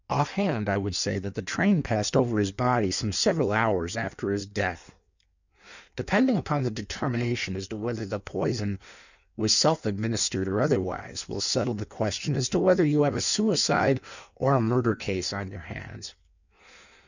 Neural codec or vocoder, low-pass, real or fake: codec, 16 kHz in and 24 kHz out, 1.1 kbps, FireRedTTS-2 codec; 7.2 kHz; fake